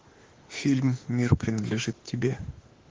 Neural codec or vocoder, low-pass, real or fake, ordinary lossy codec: autoencoder, 48 kHz, 32 numbers a frame, DAC-VAE, trained on Japanese speech; 7.2 kHz; fake; Opus, 16 kbps